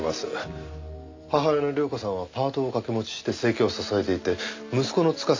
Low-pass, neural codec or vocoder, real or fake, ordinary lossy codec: 7.2 kHz; none; real; MP3, 64 kbps